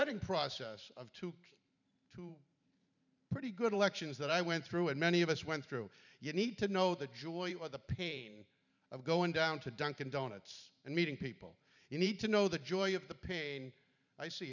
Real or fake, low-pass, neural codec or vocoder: fake; 7.2 kHz; vocoder, 44.1 kHz, 128 mel bands every 512 samples, BigVGAN v2